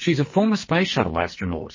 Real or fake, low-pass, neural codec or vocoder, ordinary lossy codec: fake; 7.2 kHz; codec, 44.1 kHz, 2.6 kbps, SNAC; MP3, 32 kbps